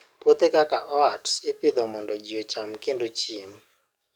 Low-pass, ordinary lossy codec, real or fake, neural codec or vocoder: 19.8 kHz; none; fake; codec, 44.1 kHz, 7.8 kbps, DAC